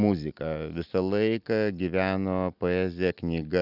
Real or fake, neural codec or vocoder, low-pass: real; none; 5.4 kHz